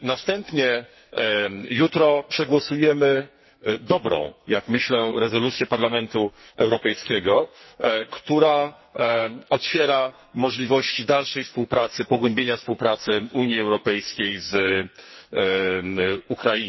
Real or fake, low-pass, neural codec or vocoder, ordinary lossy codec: fake; 7.2 kHz; codec, 44.1 kHz, 2.6 kbps, SNAC; MP3, 24 kbps